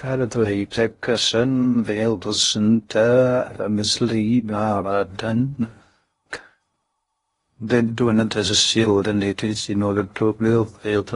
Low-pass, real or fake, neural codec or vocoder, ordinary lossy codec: 10.8 kHz; fake; codec, 16 kHz in and 24 kHz out, 0.6 kbps, FocalCodec, streaming, 2048 codes; AAC, 32 kbps